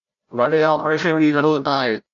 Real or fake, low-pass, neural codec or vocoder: fake; 7.2 kHz; codec, 16 kHz, 0.5 kbps, FreqCodec, larger model